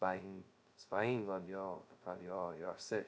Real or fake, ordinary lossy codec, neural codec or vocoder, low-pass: fake; none; codec, 16 kHz, 0.2 kbps, FocalCodec; none